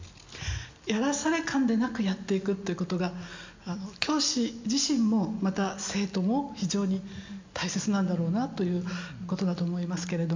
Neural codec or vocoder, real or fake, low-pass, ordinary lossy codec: none; real; 7.2 kHz; MP3, 64 kbps